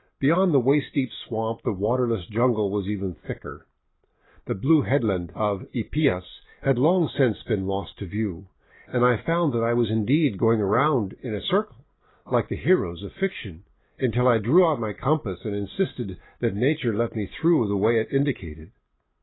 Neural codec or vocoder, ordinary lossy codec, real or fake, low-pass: none; AAC, 16 kbps; real; 7.2 kHz